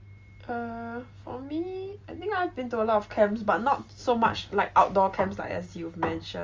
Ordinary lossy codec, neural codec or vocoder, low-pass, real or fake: Opus, 32 kbps; none; 7.2 kHz; real